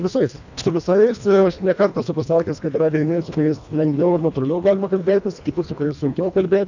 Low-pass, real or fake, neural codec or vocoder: 7.2 kHz; fake; codec, 24 kHz, 1.5 kbps, HILCodec